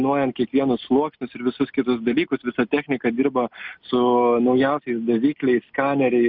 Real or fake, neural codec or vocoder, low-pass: real; none; 5.4 kHz